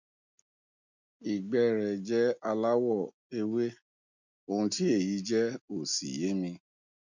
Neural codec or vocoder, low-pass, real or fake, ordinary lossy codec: none; 7.2 kHz; real; none